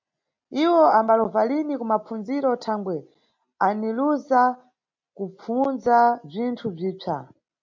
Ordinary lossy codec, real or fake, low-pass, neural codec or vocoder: MP3, 64 kbps; real; 7.2 kHz; none